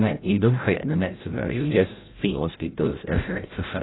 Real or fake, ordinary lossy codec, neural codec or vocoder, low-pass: fake; AAC, 16 kbps; codec, 16 kHz, 0.5 kbps, FreqCodec, larger model; 7.2 kHz